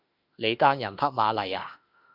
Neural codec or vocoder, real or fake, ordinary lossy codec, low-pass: autoencoder, 48 kHz, 32 numbers a frame, DAC-VAE, trained on Japanese speech; fake; Opus, 64 kbps; 5.4 kHz